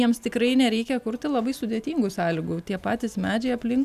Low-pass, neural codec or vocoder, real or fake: 14.4 kHz; none; real